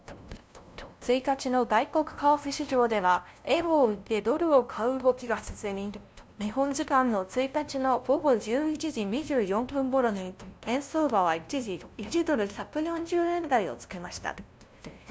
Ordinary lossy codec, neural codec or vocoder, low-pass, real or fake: none; codec, 16 kHz, 0.5 kbps, FunCodec, trained on LibriTTS, 25 frames a second; none; fake